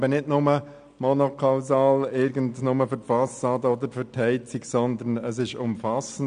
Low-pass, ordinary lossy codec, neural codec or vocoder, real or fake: 9.9 kHz; AAC, 96 kbps; none; real